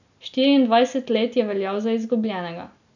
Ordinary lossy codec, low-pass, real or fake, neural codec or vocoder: none; 7.2 kHz; real; none